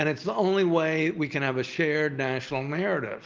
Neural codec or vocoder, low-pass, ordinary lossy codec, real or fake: none; 7.2 kHz; Opus, 32 kbps; real